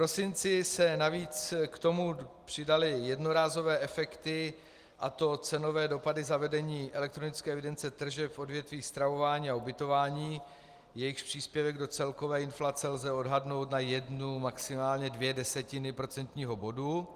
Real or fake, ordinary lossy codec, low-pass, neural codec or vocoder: real; Opus, 24 kbps; 14.4 kHz; none